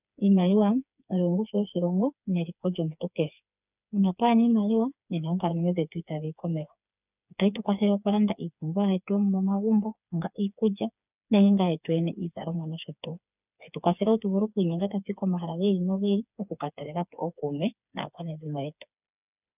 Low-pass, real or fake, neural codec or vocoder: 3.6 kHz; fake; codec, 16 kHz, 4 kbps, FreqCodec, smaller model